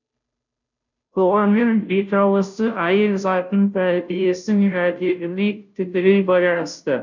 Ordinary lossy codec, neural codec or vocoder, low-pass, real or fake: none; codec, 16 kHz, 0.5 kbps, FunCodec, trained on Chinese and English, 25 frames a second; 7.2 kHz; fake